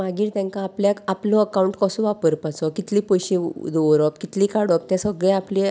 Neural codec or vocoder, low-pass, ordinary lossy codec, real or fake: none; none; none; real